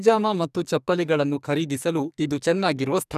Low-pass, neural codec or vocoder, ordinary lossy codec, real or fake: 14.4 kHz; codec, 44.1 kHz, 2.6 kbps, SNAC; none; fake